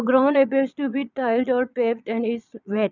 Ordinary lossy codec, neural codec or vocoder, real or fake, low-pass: none; vocoder, 22.05 kHz, 80 mel bands, Vocos; fake; 7.2 kHz